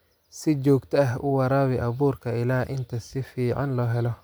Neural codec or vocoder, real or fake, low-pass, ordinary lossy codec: none; real; none; none